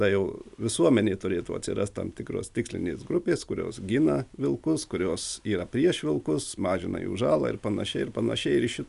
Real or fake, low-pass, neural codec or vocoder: real; 10.8 kHz; none